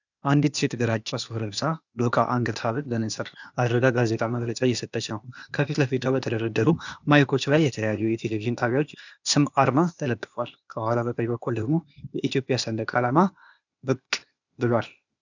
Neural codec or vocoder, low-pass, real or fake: codec, 16 kHz, 0.8 kbps, ZipCodec; 7.2 kHz; fake